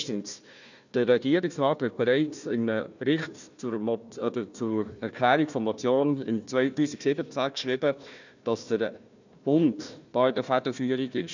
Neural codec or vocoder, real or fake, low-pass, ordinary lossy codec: codec, 16 kHz, 1 kbps, FunCodec, trained on Chinese and English, 50 frames a second; fake; 7.2 kHz; none